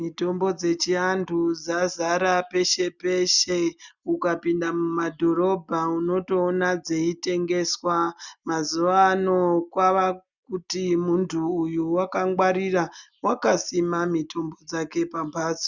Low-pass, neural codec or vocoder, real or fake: 7.2 kHz; none; real